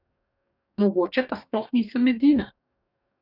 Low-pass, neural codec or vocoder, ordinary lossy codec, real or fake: 5.4 kHz; codec, 44.1 kHz, 2.6 kbps, DAC; none; fake